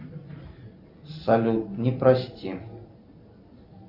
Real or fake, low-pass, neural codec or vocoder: real; 5.4 kHz; none